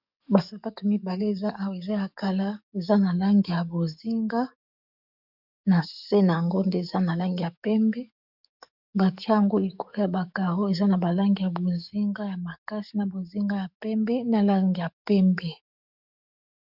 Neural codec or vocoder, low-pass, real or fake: codec, 44.1 kHz, 7.8 kbps, DAC; 5.4 kHz; fake